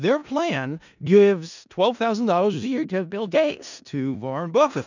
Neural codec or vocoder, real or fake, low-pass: codec, 16 kHz in and 24 kHz out, 0.4 kbps, LongCat-Audio-Codec, four codebook decoder; fake; 7.2 kHz